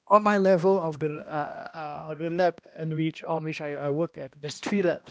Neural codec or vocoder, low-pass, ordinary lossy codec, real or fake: codec, 16 kHz, 1 kbps, X-Codec, HuBERT features, trained on balanced general audio; none; none; fake